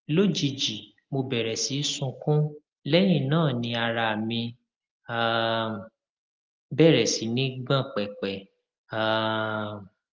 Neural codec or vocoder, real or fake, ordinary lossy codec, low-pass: none; real; Opus, 32 kbps; 7.2 kHz